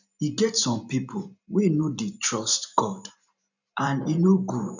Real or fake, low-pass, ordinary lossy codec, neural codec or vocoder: fake; 7.2 kHz; none; vocoder, 44.1 kHz, 128 mel bands every 512 samples, BigVGAN v2